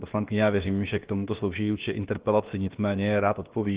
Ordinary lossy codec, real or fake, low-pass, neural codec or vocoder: Opus, 16 kbps; fake; 3.6 kHz; codec, 16 kHz, about 1 kbps, DyCAST, with the encoder's durations